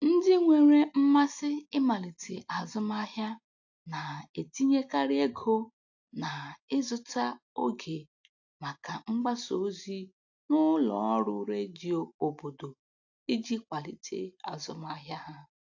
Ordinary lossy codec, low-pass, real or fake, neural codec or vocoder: AAC, 48 kbps; 7.2 kHz; real; none